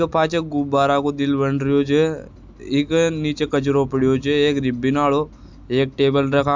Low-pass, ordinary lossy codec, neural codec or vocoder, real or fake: 7.2 kHz; MP3, 64 kbps; none; real